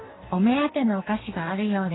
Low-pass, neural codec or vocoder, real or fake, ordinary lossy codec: 7.2 kHz; codec, 44.1 kHz, 2.6 kbps, DAC; fake; AAC, 16 kbps